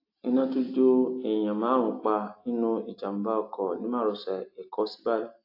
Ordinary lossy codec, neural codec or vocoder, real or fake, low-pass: AAC, 32 kbps; none; real; 5.4 kHz